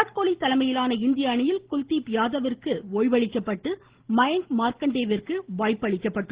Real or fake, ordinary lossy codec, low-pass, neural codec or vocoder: real; Opus, 16 kbps; 3.6 kHz; none